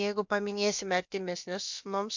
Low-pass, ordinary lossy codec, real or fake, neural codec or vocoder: 7.2 kHz; MP3, 64 kbps; fake; codec, 16 kHz, about 1 kbps, DyCAST, with the encoder's durations